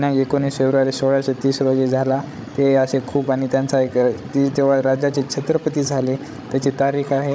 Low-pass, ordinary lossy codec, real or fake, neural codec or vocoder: none; none; fake; codec, 16 kHz, 16 kbps, FreqCodec, larger model